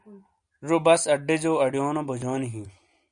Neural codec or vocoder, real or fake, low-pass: none; real; 9.9 kHz